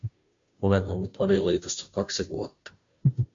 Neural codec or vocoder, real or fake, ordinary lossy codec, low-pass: codec, 16 kHz, 0.5 kbps, FunCodec, trained on Chinese and English, 25 frames a second; fake; MP3, 48 kbps; 7.2 kHz